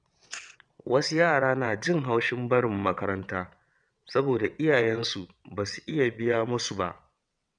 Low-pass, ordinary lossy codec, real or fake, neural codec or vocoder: 9.9 kHz; none; fake; vocoder, 22.05 kHz, 80 mel bands, WaveNeXt